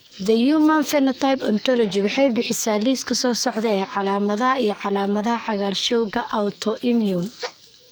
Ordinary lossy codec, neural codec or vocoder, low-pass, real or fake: none; codec, 44.1 kHz, 2.6 kbps, SNAC; none; fake